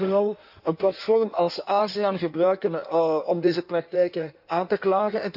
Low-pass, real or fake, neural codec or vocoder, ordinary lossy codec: 5.4 kHz; fake; codec, 16 kHz in and 24 kHz out, 1.1 kbps, FireRedTTS-2 codec; none